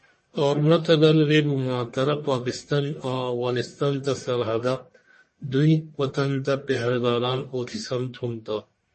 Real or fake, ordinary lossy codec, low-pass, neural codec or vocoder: fake; MP3, 32 kbps; 10.8 kHz; codec, 44.1 kHz, 1.7 kbps, Pupu-Codec